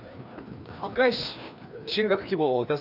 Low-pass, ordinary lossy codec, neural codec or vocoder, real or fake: 5.4 kHz; none; codec, 16 kHz, 2 kbps, FreqCodec, larger model; fake